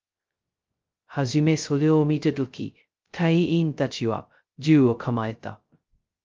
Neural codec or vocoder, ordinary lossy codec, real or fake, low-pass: codec, 16 kHz, 0.2 kbps, FocalCodec; Opus, 24 kbps; fake; 7.2 kHz